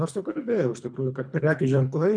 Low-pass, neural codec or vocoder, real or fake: 9.9 kHz; codec, 24 kHz, 3 kbps, HILCodec; fake